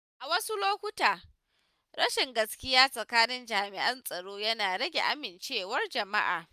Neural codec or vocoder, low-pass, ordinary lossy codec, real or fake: none; 14.4 kHz; none; real